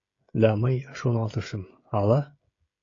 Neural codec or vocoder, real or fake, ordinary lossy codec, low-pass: codec, 16 kHz, 16 kbps, FreqCodec, smaller model; fake; AAC, 32 kbps; 7.2 kHz